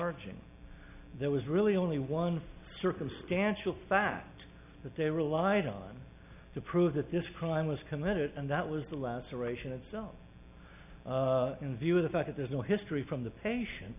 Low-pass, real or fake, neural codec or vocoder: 3.6 kHz; real; none